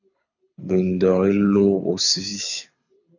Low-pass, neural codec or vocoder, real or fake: 7.2 kHz; codec, 24 kHz, 6 kbps, HILCodec; fake